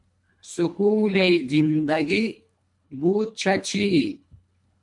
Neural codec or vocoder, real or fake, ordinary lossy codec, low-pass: codec, 24 kHz, 1.5 kbps, HILCodec; fake; MP3, 64 kbps; 10.8 kHz